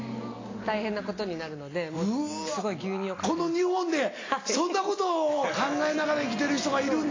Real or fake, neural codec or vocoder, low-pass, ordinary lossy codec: real; none; 7.2 kHz; AAC, 32 kbps